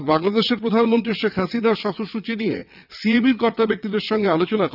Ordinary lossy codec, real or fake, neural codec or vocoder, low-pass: none; fake; vocoder, 22.05 kHz, 80 mel bands, WaveNeXt; 5.4 kHz